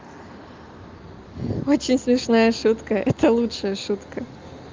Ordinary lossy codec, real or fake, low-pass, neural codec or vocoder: Opus, 32 kbps; real; 7.2 kHz; none